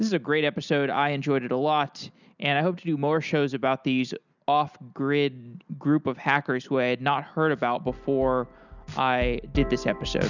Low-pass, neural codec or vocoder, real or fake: 7.2 kHz; none; real